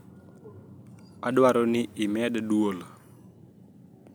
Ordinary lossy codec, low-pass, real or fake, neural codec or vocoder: none; none; real; none